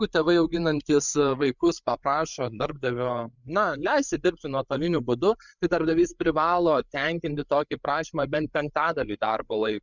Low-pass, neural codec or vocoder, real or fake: 7.2 kHz; codec, 16 kHz, 8 kbps, FreqCodec, larger model; fake